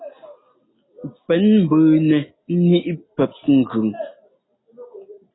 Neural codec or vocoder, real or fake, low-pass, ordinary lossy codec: none; real; 7.2 kHz; AAC, 16 kbps